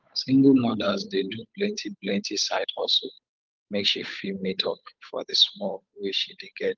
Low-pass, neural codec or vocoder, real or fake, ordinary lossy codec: 7.2 kHz; codec, 16 kHz, 8 kbps, FunCodec, trained on Chinese and English, 25 frames a second; fake; Opus, 32 kbps